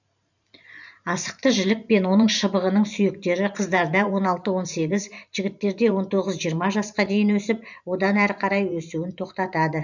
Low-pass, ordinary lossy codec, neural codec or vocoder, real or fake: 7.2 kHz; none; vocoder, 44.1 kHz, 128 mel bands every 512 samples, BigVGAN v2; fake